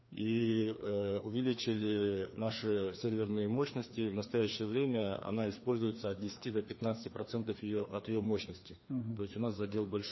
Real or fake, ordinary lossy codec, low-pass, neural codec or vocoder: fake; MP3, 24 kbps; 7.2 kHz; codec, 16 kHz, 2 kbps, FreqCodec, larger model